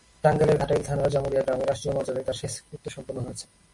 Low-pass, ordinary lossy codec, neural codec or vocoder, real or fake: 10.8 kHz; MP3, 48 kbps; none; real